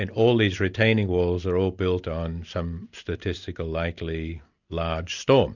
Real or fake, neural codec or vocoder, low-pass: real; none; 7.2 kHz